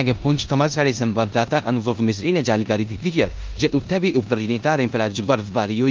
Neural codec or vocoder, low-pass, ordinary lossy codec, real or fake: codec, 16 kHz in and 24 kHz out, 0.9 kbps, LongCat-Audio-Codec, four codebook decoder; 7.2 kHz; Opus, 24 kbps; fake